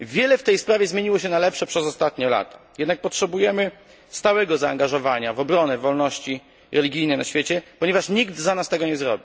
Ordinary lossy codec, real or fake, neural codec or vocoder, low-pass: none; real; none; none